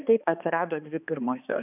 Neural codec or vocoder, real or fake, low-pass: codec, 16 kHz, 2 kbps, X-Codec, HuBERT features, trained on balanced general audio; fake; 3.6 kHz